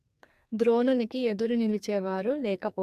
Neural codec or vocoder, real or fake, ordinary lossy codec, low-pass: codec, 44.1 kHz, 2.6 kbps, SNAC; fake; none; 14.4 kHz